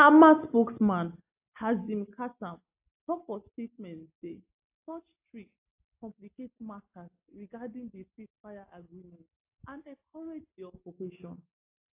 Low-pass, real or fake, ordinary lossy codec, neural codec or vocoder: 3.6 kHz; real; AAC, 32 kbps; none